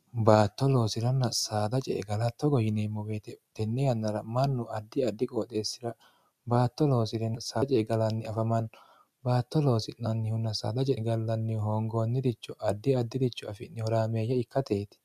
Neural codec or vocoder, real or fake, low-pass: none; real; 14.4 kHz